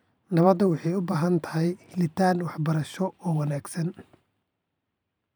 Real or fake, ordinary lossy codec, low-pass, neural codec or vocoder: fake; none; none; vocoder, 44.1 kHz, 128 mel bands every 512 samples, BigVGAN v2